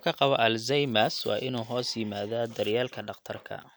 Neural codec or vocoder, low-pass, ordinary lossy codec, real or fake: none; none; none; real